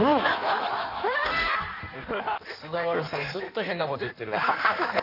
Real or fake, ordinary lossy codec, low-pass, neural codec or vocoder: fake; none; 5.4 kHz; codec, 16 kHz in and 24 kHz out, 1.1 kbps, FireRedTTS-2 codec